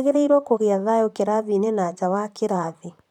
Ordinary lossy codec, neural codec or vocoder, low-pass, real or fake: none; vocoder, 44.1 kHz, 128 mel bands, Pupu-Vocoder; 19.8 kHz; fake